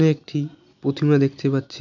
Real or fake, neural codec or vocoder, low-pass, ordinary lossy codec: real; none; 7.2 kHz; none